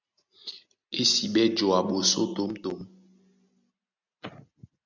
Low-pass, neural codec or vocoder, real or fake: 7.2 kHz; none; real